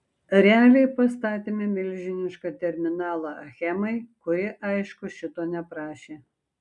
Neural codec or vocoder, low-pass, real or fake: none; 10.8 kHz; real